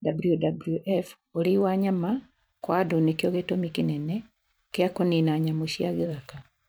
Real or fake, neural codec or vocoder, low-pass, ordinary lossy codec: fake; vocoder, 44.1 kHz, 128 mel bands every 512 samples, BigVGAN v2; none; none